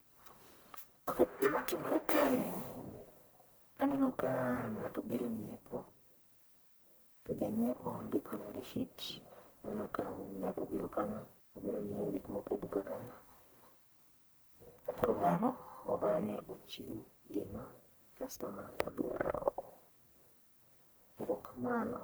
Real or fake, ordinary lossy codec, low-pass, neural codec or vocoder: fake; none; none; codec, 44.1 kHz, 1.7 kbps, Pupu-Codec